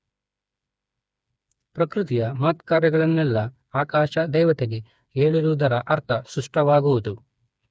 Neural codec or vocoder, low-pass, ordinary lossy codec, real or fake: codec, 16 kHz, 4 kbps, FreqCodec, smaller model; none; none; fake